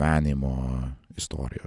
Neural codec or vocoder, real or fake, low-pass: none; real; 10.8 kHz